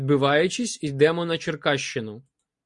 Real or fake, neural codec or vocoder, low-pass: real; none; 10.8 kHz